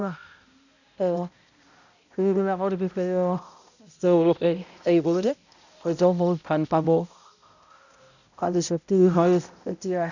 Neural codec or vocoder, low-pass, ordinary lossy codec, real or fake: codec, 16 kHz, 0.5 kbps, X-Codec, HuBERT features, trained on balanced general audio; 7.2 kHz; none; fake